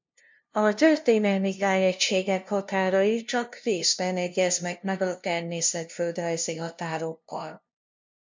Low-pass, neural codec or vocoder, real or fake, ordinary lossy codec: 7.2 kHz; codec, 16 kHz, 0.5 kbps, FunCodec, trained on LibriTTS, 25 frames a second; fake; MP3, 64 kbps